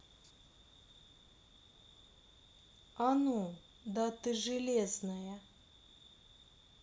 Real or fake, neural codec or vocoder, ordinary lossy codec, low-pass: real; none; none; none